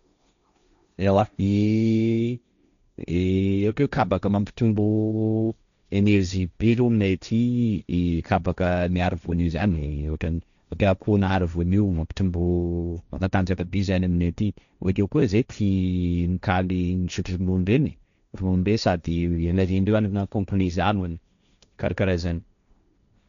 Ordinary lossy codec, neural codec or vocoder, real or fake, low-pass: none; codec, 16 kHz, 1.1 kbps, Voila-Tokenizer; fake; 7.2 kHz